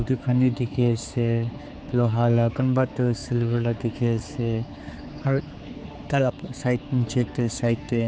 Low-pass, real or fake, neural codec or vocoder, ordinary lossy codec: none; fake; codec, 16 kHz, 4 kbps, X-Codec, HuBERT features, trained on general audio; none